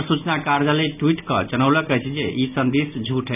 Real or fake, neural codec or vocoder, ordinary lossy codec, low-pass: real; none; none; 3.6 kHz